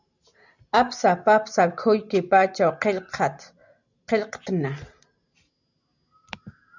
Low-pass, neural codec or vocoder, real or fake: 7.2 kHz; none; real